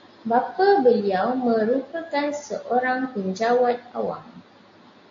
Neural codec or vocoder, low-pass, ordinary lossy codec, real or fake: none; 7.2 kHz; AAC, 48 kbps; real